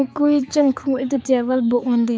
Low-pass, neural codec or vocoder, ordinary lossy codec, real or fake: none; codec, 16 kHz, 4 kbps, X-Codec, HuBERT features, trained on balanced general audio; none; fake